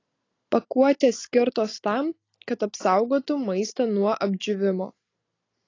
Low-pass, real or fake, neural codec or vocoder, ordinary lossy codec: 7.2 kHz; real; none; AAC, 32 kbps